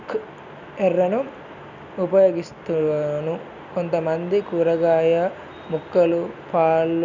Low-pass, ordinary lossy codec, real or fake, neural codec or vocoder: 7.2 kHz; none; real; none